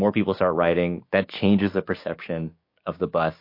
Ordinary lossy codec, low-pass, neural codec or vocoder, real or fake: MP3, 32 kbps; 5.4 kHz; codec, 16 kHz, 6 kbps, DAC; fake